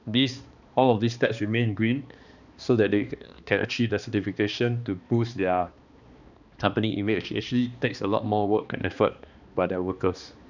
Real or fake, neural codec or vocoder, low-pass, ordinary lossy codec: fake; codec, 16 kHz, 2 kbps, X-Codec, HuBERT features, trained on balanced general audio; 7.2 kHz; none